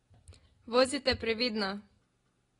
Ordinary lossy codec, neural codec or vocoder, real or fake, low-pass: AAC, 32 kbps; none; real; 10.8 kHz